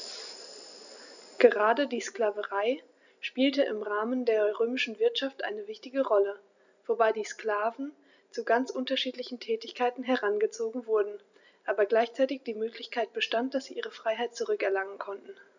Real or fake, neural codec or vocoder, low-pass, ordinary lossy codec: real; none; 7.2 kHz; none